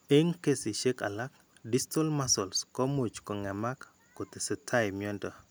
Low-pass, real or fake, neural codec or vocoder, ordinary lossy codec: none; real; none; none